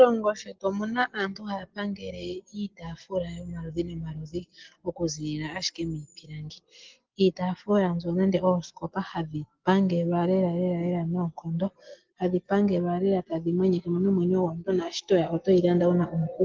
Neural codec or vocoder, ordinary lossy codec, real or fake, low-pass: none; Opus, 16 kbps; real; 7.2 kHz